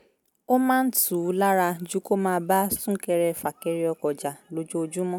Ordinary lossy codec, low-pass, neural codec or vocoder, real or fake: none; none; none; real